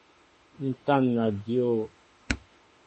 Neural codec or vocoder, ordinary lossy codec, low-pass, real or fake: autoencoder, 48 kHz, 32 numbers a frame, DAC-VAE, trained on Japanese speech; MP3, 32 kbps; 10.8 kHz; fake